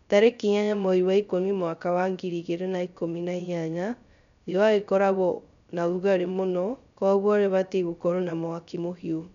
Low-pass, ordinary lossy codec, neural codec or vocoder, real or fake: 7.2 kHz; none; codec, 16 kHz, 0.3 kbps, FocalCodec; fake